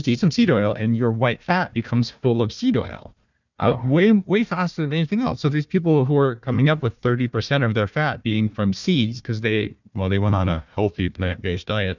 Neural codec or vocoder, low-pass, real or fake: codec, 16 kHz, 1 kbps, FunCodec, trained on Chinese and English, 50 frames a second; 7.2 kHz; fake